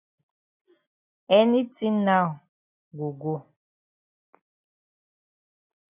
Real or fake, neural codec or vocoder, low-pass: real; none; 3.6 kHz